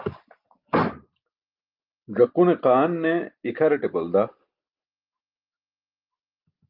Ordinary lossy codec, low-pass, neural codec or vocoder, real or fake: Opus, 24 kbps; 5.4 kHz; none; real